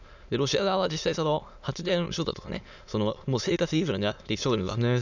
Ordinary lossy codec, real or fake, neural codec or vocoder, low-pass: none; fake; autoencoder, 22.05 kHz, a latent of 192 numbers a frame, VITS, trained on many speakers; 7.2 kHz